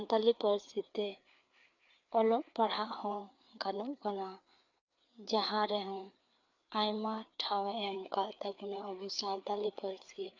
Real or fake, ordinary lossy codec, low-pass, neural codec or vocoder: fake; none; 7.2 kHz; codec, 16 kHz, 4 kbps, FreqCodec, larger model